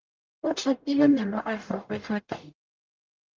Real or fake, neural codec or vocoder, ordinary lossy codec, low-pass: fake; codec, 44.1 kHz, 0.9 kbps, DAC; Opus, 32 kbps; 7.2 kHz